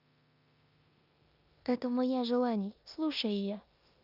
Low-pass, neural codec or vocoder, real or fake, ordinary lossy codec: 5.4 kHz; codec, 16 kHz in and 24 kHz out, 0.9 kbps, LongCat-Audio-Codec, four codebook decoder; fake; Opus, 64 kbps